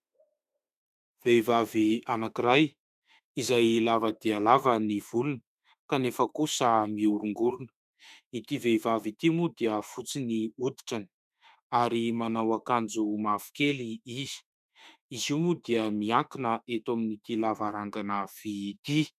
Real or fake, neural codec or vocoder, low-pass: fake; autoencoder, 48 kHz, 32 numbers a frame, DAC-VAE, trained on Japanese speech; 14.4 kHz